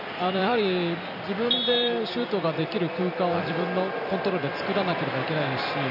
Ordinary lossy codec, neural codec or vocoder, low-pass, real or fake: none; vocoder, 44.1 kHz, 128 mel bands every 256 samples, BigVGAN v2; 5.4 kHz; fake